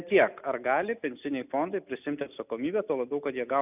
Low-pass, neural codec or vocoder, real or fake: 3.6 kHz; none; real